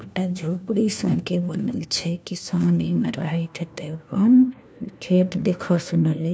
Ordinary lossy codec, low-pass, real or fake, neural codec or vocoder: none; none; fake; codec, 16 kHz, 1 kbps, FunCodec, trained on LibriTTS, 50 frames a second